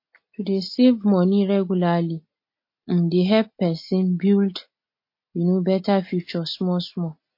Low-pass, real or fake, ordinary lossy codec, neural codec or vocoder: 5.4 kHz; real; MP3, 32 kbps; none